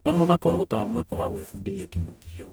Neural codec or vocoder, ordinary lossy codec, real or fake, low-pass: codec, 44.1 kHz, 0.9 kbps, DAC; none; fake; none